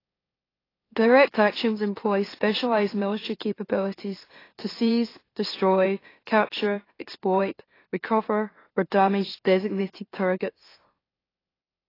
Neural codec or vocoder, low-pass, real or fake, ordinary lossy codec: autoencoder, 44.1 kHz, a latent of 192 numbers a frame, MeloTTS; 5.4 kHz; fake; AAC, 24 kbps